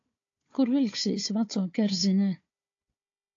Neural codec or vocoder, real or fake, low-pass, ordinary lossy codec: codec, 16 kHz, 4 kbps, FunCodec, trained on Chinese and English, 50 frames a second; fake; 7.2 kHz; AAC, 48 kbps